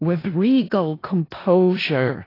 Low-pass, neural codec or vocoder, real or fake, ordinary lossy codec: 5.4 kHz; codec, 16 kHz in and 24 kHz out, 0.4 kbps, LongCat-Audio-Codec, four codebook decoder; fake; AAC, 24 kbps